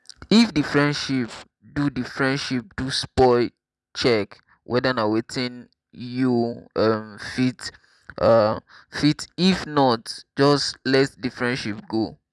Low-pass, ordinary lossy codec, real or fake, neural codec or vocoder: none; none; real; none